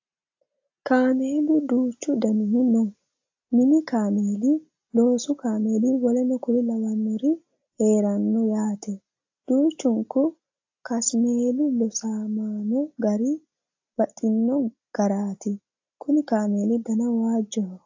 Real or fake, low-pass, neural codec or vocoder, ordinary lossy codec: real; 7.2 kHz; none; AAC, 48 kbps